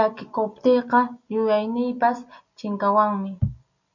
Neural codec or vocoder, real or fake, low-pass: none; real; 7.2 kHz